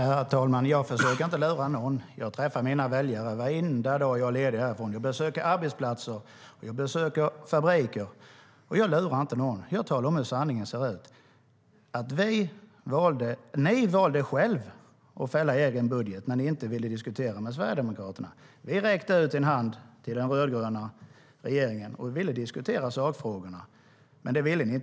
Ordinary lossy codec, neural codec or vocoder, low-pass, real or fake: none; none; none; real